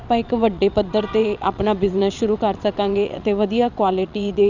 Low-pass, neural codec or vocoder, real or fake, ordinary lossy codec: 7.2 kHz; vocoder, 22.05 kHz, 80 mel bands, WaveNeXt; fake; none